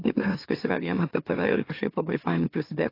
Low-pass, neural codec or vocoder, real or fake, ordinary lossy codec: 5.4 kHz; autoencoder, 44.1 kHz, a latent of 192 numbers a frame, MeloTTS; fake; AAC, 32 kbps